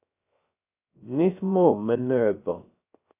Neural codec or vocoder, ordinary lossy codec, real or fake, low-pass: codec, 16 kHz, 0.3 kbps, FocalCodec; AAC, 32 kbps; fake; 3.6 kHz